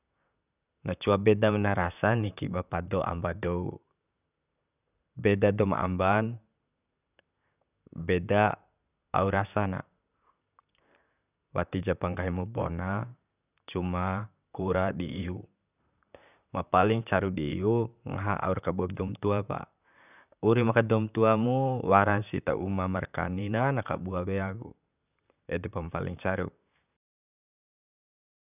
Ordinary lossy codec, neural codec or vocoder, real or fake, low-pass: none; vocoder, 44.1 kHz, 128 mel bands, Pupu-Vocoder; fake; 3.6 kHz